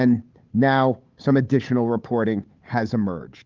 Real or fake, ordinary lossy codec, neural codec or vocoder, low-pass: fake; Opus, 32 kbps; codec, 16 kHz, 8 kbps, FunCodec, trained on Chinese and English, 25 frames a second; 7.2 kHz